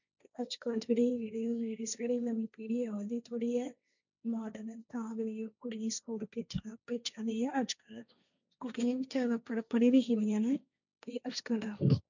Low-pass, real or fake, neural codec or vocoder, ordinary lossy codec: 7.2 kHz; fake; codec, 16 kHz, 1.1 kbps, Voila-Tokenizer; none